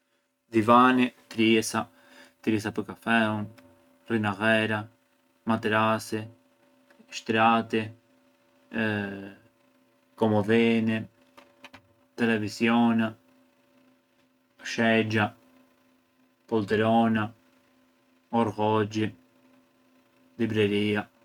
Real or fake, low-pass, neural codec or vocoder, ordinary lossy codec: real; 19.8 kHz; none; none